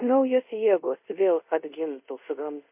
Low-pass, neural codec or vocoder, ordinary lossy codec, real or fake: 3.6 kHz; codec, 24 kHz, 0.5 kbps, DualCodec; MP3, 32 kbps; fake